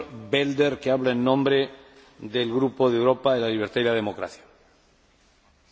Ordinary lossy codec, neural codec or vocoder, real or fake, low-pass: none; none; real; none